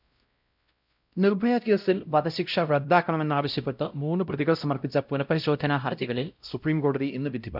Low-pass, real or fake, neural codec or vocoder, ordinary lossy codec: 5.4 kHz; fake; codec, 16 kHz, 0.5 kbps, X-Codec, WavLM features, trained on Multilingual LibriSpeech; none